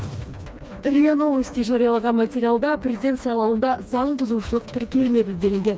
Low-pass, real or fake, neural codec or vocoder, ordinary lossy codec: none; fake; codec, 16 kHz, 2 kbps, FreqCodec, smaller model; none